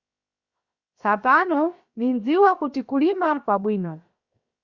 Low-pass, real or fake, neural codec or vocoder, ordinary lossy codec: 7.2 kHz; fake; codec, 16 kHz, 0.7 kbps, FocalCodec; Opus, 64 kbps